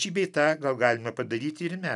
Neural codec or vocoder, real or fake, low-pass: none; real; 10.8 kHz